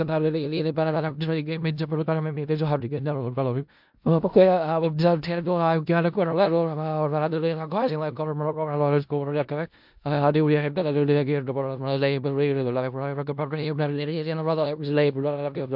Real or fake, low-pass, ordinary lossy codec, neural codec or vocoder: fake; 5.4 kHz; MP3, 48 kbps; codec, 16 kHz in and 24 kHz out, 0.4 kbps, LongCat-Audio-Codec, four codebook decoder